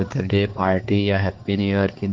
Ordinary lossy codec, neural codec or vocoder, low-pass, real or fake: Opus, 16 kbps; codec, 16 kHz, 4 kbps, X-Codec, HuBERT features, trained on balanced general audio; 7.2 kHz; fake